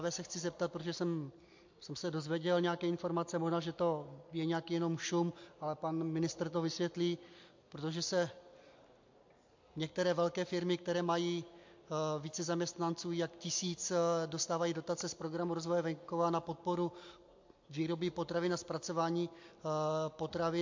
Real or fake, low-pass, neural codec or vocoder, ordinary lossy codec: real; 7.2 kHz; none; MP3, 48 kbps